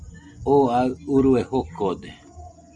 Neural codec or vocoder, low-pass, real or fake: none; 10.8 kHz; real